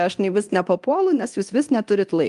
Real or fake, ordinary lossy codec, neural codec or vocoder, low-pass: fake; Opus, 32 kbps; codec, 24 kHz, 0.9 kbps, DualCodec; 10.8 kHz